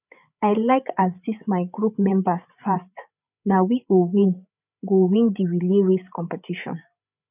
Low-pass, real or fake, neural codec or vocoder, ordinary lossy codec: 3.6 kHz; fake; codec, 16 kHz, 8 kbps, FreqCodec, larger model; none